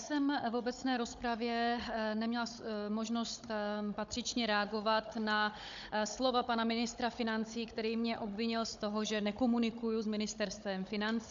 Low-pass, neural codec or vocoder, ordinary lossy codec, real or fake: 7.2 kHz; codec, 16 kHz, 16 kbps, FunCodec, trained on Chinese and English, 50 frames a second; MP3, 64 kbps; fake